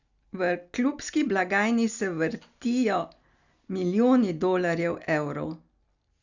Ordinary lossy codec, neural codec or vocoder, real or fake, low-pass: Opus, 64 kbps; none; real; 7.2 kHz